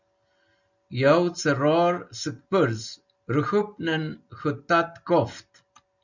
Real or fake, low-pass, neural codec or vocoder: real; 7.2 kHz; none